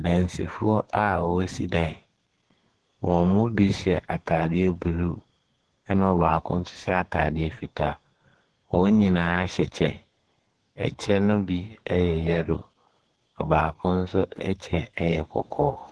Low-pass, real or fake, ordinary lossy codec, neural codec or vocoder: 10.8 kHz; fake; Opus, 16 kbps; codec, 32 kHz, 1.9 kbps, SNAC